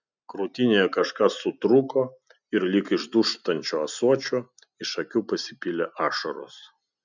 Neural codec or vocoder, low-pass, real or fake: none; 7.2 kHz; real